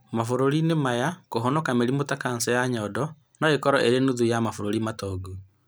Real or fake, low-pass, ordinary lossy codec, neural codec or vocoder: real; none; none; none